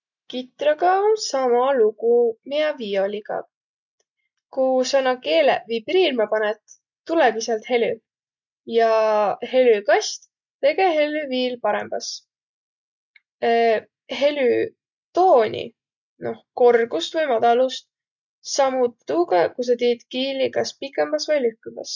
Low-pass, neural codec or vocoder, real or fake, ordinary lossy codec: 7.2 kHz; none; real; none